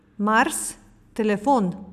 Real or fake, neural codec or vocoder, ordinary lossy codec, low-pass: real; none; none; 14.4 kHz